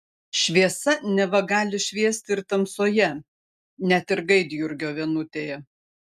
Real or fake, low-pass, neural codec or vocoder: real; 14.4 kHz; none